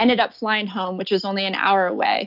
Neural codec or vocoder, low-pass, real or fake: none; 5.4 kHz; real